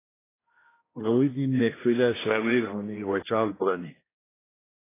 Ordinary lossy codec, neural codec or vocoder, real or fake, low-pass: AAC, 16 kbps; codec, 16 kHz, 1 kbps, X-Codec, HuBERT features, trained on general audio; fake; 3.6 kHz